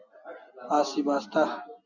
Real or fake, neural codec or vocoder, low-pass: real; none; 7.2 kHz